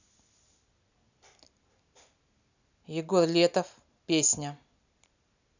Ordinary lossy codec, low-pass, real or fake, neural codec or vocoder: none; 7.2 kHz; real; none